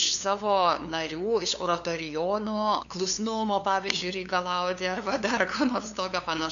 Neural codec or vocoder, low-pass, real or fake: codec, 16 kHz, 2 kbps, FunCodec, trained on LibriTTS, 25 frames a second; 7.2 kHz; fake